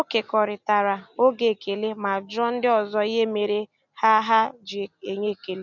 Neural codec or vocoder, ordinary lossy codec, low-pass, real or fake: none; none; 7.2 kHz; real